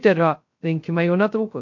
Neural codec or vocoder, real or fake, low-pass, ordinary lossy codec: codec, 16 kHz, 0.2 kbps, FocalCodec; fake; 7.2 kHz; MP3, 48 kbps